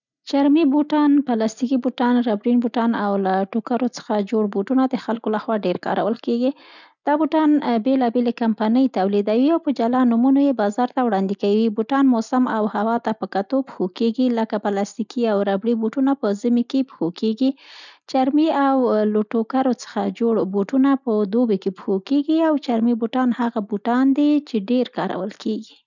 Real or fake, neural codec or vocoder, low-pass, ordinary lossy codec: real; none; 7.2 kHz; none